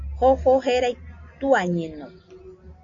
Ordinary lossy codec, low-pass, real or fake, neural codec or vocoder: AAC, 64 kbps; 7.2 kHz; real; none